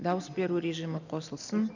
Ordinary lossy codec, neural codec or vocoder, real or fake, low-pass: none; none; real; 7.2 kHz